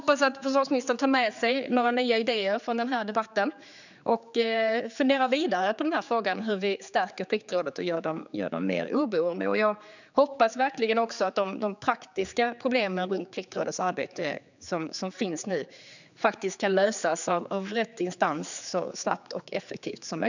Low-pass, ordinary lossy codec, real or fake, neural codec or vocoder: 7.2 kHz; none; fake; codec, 16 kHz, 4 kbps, X-Codec, HuBERT features, trained on general audio